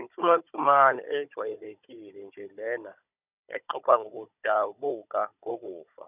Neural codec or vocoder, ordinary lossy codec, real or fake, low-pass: codec, 16 kHz, 16 kbps, FunCodec, trained on Chinese and English, 50 frames a second; none; fake; 3.6 kHz